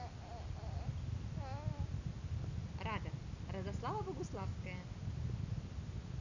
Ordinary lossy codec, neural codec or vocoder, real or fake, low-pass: none; none; real; 7.2 kHz